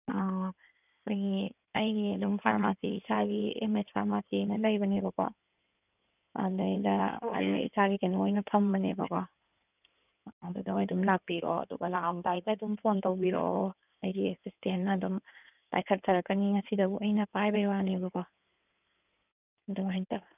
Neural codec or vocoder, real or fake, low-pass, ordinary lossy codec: codec, 16 kHz in and 24 kHz out, 2.2 kbps, FireRedTTS-2 codec; fake; 3.6 kHz; none